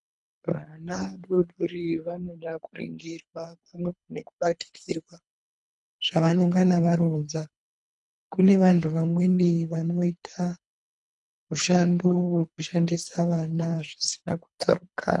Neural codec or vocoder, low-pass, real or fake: codec, 24 kHz, 3 kbps, HILCodec; 10.8 kHz; fake